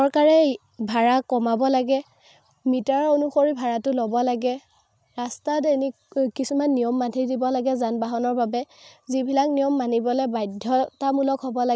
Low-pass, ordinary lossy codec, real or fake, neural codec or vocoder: none; none; real; none